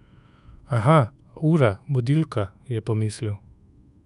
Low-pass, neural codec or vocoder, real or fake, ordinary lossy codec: 10.8 kHz; codec, 24 kHz, 1.2 kbps, DualCodec; fake; none